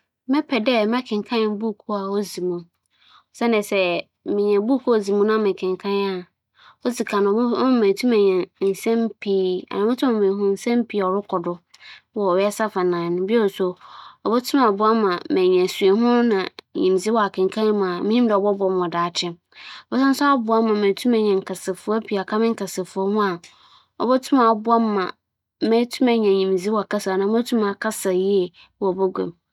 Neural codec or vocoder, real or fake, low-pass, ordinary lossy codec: none; real; 19.8 kHz; none